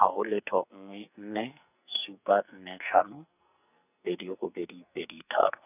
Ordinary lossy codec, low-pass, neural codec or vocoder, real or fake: none; 3.6 kHz; codec, 44.1 kHz, 2.6 kbps, SNAC; fake